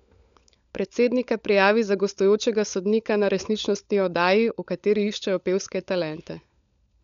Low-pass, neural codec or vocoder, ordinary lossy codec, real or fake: 7.2 kHz; codec, 16 kHz, 16 kbps, FunCodec, trained on LibriTTS, 50 frames a second; none; fake